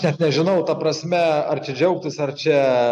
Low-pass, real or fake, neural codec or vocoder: 10.8 kHz; real; none